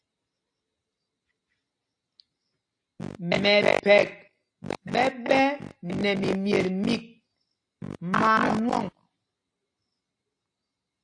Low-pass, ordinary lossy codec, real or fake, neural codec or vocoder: 9.9 kHz; AAC, 48 kbps; real; none